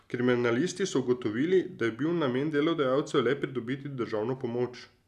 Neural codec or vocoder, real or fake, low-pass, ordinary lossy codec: none; real; 14.4 kHz; none